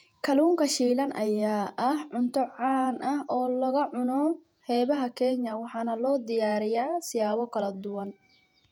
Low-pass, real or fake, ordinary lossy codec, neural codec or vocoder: 19.8 kHz; fake; none; vocoder, 48 kHz, 128 mel bands, Vocos